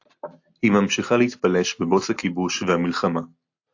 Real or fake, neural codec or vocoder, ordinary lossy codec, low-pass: real; none; AAC, 48 kbps; 7.2 kHz